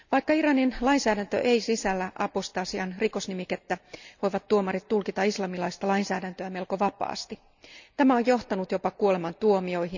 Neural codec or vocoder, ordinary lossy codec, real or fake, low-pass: none; none; real; 7.2 kHz